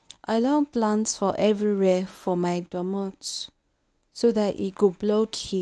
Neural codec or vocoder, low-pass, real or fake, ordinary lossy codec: codec, 24 kHz, 0.9 kbps, WavTokenizer, medium speech release version 1; none; fake; none